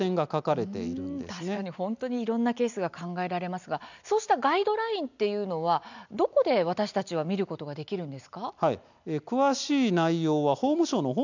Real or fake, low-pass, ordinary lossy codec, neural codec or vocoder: real; 7.2 kHz; none; none